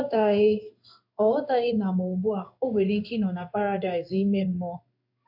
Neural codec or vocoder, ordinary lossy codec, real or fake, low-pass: codec, 16 kHz in and 24 kHz out, 1 kbps, XY-Tokenizer; AAC, 48 kbps; fake; 5.4 kHz